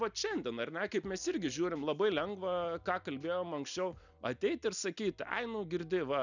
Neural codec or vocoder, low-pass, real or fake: none; 7.2 kHz; real